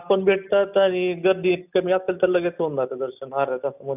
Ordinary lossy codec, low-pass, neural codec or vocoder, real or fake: none; 3.6 kHz; none; real